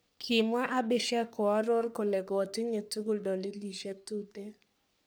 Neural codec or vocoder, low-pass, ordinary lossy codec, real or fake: codec, 44.1 kHz, 3.4 kbps, Pupu-Codec; none; none; fake